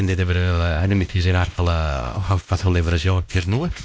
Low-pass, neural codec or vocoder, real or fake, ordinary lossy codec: none; codec, 16 kHz, 1 kbps, X-Codec, WavLM features, trained on Multilingual LibriSpeech; fake; none